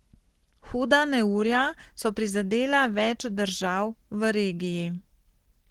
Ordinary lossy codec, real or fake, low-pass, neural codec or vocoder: Opus, 16 kbps; fake; 19.8 kHz; codec, 44.1 kHz, 7.8 kbps, Pupu-Codec